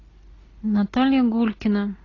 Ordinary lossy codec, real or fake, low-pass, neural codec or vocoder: AAC, 32 kbps; real; 7.2 kHz; none